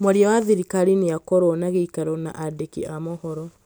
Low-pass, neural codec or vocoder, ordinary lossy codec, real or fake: none; none; none; real